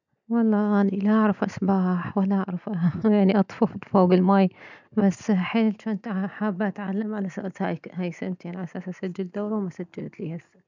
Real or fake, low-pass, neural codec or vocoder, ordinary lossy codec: real; 7.2 kHz; none; none